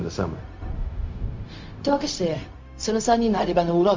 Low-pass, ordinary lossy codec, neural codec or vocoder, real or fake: 7.2 kHz; MP3, 48 kbps; codec, 16 kHz, 0.4 kbps, LongCat-Audio-Codec; fake